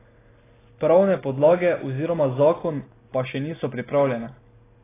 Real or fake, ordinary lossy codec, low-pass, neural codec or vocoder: real; AAC, 16 kbps; 3.6 kHz; none